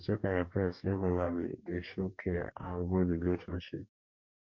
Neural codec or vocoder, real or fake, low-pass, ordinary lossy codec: codec, 44.1 kHz, 2.6 kbps, DAC; fake; 7.2 kHz; MP3, 48 kbps